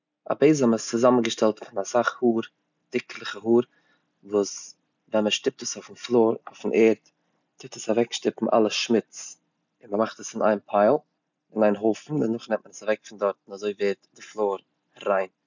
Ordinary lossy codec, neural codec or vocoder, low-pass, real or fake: none; none; 7.2 kHz; real